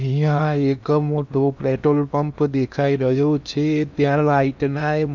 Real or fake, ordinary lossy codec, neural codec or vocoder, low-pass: fake; none; codec, 16 kHz in and 24 kHz out, 0.8 kbps, FocalCodec, streaming, 65536 codes; 7.2 kHz